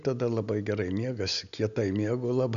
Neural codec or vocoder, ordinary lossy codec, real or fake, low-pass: none; AAC, 96 kbps; real; 7.2 kHz